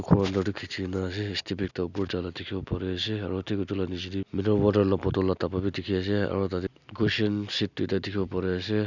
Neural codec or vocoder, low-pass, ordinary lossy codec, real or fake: none; 7.2 kHz; none; real